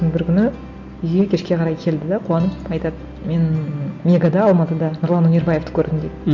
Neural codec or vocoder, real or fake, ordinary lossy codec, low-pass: none; real; none; 7.2 kHz